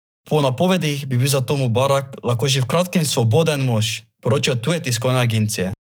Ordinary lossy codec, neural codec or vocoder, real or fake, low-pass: none; codec, 44.1 kHz, 7.8 kbps, DAC; fake; none